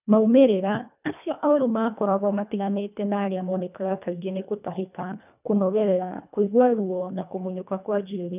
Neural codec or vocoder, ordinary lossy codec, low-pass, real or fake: codec, 24 kHz, 1.5 kbps, HILCodec; none; 3.6 kHz; fake